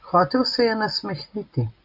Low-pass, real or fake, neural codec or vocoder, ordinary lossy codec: 5.4 kHz; real; none; AAC, 48 kbps